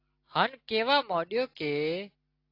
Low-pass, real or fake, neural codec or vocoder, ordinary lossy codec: 5.4 kHz; real; none; AAC, 32 kbps